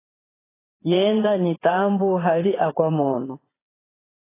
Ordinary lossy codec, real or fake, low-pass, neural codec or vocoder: AAC, 16 kbps; fake; 3.6 kHz; vocoder, 22.05 kHz, 80 mel bands, Vocos